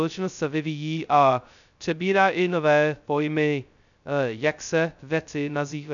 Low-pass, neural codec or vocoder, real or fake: 7.2 kHz; codec, 16 kHz, 0.2 kbps, FocalCodec; fake